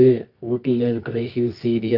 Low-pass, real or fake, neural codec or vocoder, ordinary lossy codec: 5.4 kHz; fake; codec, 24 kHz, 0.9 kbps, WavTokenizer, medium music audio release; Opus, 32 kbps